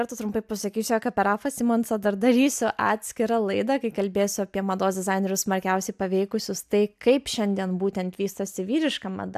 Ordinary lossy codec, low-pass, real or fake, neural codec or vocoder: AAC, 96 kbps; 14.4 kHz; real; none